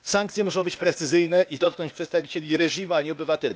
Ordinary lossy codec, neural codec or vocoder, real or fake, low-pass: none; codec, 16 kHz, 0.8 kbps, ZipCodec; fake; none